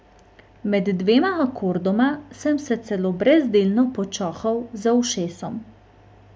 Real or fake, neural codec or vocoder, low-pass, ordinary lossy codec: real; none; none; none